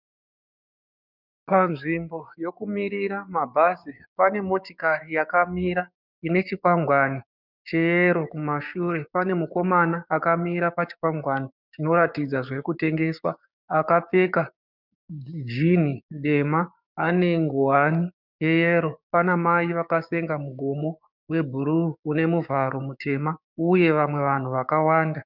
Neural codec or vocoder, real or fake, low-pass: codec, 16 kHz, 6 kbps, DAC; fake; 5.4 kHz